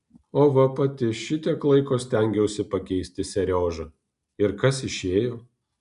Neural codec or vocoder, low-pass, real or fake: none; 10.8 kHz; real